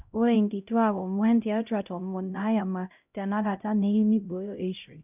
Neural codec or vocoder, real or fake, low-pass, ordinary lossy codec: codec, 16 kHz, 0.5 kbps, X-Codec, HuBERT features, trained on LibriSpeech; fake; 3.6 kHz; none